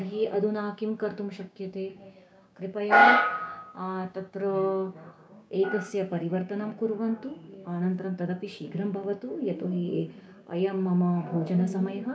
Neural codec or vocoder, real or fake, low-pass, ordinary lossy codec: codec, 16 kHz, 6 kbps, DAC; fake; none; none